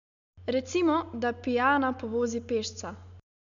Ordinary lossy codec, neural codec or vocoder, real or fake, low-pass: MP3, 96 kbps; none; real; 7.2 kHz